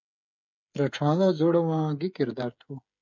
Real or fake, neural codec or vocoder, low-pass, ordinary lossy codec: fake; codec, 16 kHz, 8 kbps, FreqCodec, smaller model; 7.2 kHz; MP3, 64 kbps